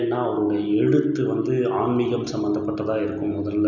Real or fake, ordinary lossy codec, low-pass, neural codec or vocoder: real; none; 7.2 kHz; none